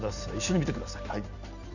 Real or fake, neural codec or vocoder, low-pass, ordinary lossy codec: real; none; 7.2 kHz; none